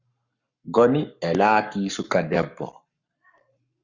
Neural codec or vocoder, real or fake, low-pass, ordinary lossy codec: codec, 44.1 kHz, 7.8 kbps, Pupu-Codec; fake; 7.2 kHz; Opus, 64 kbps